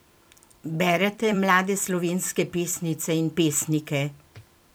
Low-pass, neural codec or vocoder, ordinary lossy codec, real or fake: none; vocoder, 44.1 kHz, 128 mel bands every 256 samples, BigVGAN v2; none; fake